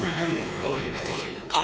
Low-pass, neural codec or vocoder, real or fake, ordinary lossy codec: none; codec, 16 kHz, 2 kbps, X-Codec, WavLM features, trained on Multilingual LibriSpeech; fake; none